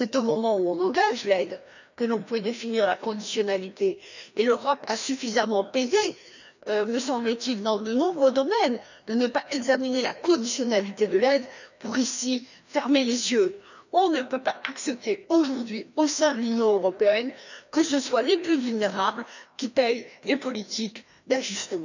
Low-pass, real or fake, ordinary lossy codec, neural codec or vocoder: 7.2 kHz; fake; none; codec, 16 kHz, 1 kbps, FreqCodec, larger model